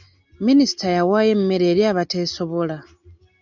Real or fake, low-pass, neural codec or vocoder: real; 7.2 kHz; none